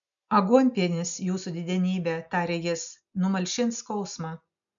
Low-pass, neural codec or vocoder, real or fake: 7.2 kHz; none; real